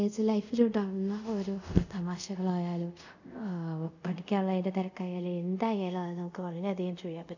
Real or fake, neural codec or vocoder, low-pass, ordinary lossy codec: fake; codec, 24 kHz, 0.5 kbps, DualCodec; 7.2 kHz; none